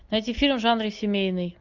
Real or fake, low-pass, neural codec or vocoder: real; 7.2 kHz; none